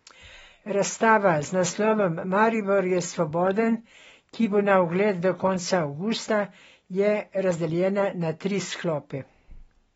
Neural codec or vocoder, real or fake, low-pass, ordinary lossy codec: none; real; 10.8 kHz; AAC, 24 kbps